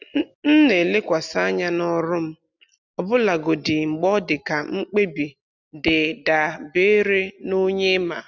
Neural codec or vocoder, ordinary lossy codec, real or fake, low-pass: none; none; real; 7.2 kHz